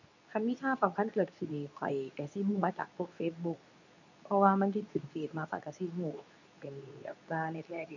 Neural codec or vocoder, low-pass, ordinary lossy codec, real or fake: codec, 24 kHz, 0.9 kbps, WavTokenizer, medium speech release version 2; 7.2 kHz; none; fake